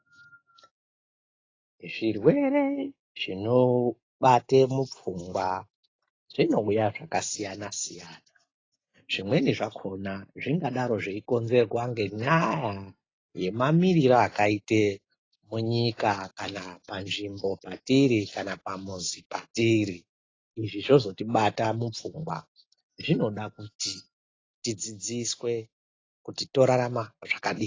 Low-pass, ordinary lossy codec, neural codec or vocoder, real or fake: 7.2 kHz; AAC, 32 kbps; none; real